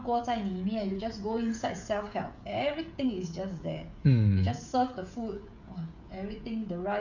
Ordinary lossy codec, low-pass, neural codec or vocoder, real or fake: none; 7.2 kHz; codec, 16 kHz, 16 kbps, FreqCodec, smaller model; fake